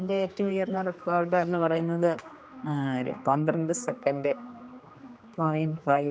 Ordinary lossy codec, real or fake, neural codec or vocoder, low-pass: none; fake; codec, 16 kHz, 2 kbps, X-Codec, HuBERT features, trained on general audio; none